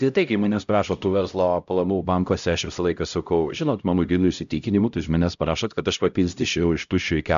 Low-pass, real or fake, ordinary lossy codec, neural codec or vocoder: 7.2 kHz; fake; AAC, 96 kbps; codec, 16 kHz, 0.5 kbps, X-Codec, HuBERT features, trained on LibriSpeech